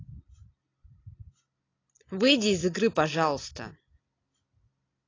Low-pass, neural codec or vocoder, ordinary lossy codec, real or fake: 7.2 kHz; none; AAC, 32 kbps; real